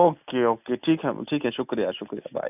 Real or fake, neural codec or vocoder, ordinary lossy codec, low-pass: real; none; none; 3.6 kHz